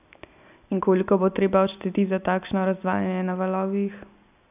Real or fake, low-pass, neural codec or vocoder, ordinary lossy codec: real; 3.6 kHz; none; none